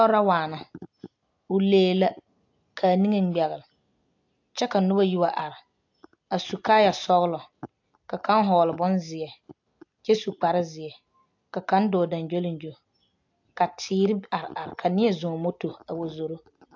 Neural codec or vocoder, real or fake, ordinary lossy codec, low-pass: none; real; AAC, 48 kbps; 7.2 kHz